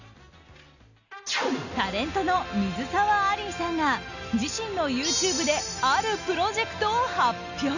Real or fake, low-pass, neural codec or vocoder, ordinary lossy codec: real; 7.2 kHz; none; none